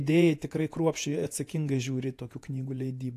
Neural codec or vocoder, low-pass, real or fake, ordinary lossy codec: vocoder, 48 kHz, 128 mel bands, Vocos; 14.4 kHz; fake; AAC, 64 kbps